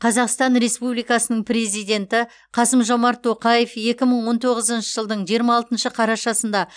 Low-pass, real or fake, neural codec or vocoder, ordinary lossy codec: 9.9 kHz; real; none; none